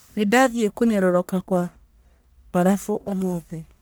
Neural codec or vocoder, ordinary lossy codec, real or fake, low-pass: codec, 44.1 kHz, 1.7 kbps, Pupu-Codec; none; fake; none